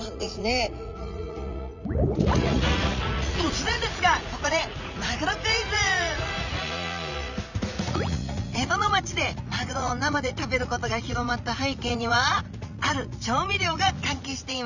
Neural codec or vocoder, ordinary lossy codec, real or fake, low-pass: vocoder, 44.1 kHz, 80 mel bands, Vocos; none; fake; 7.2 kHz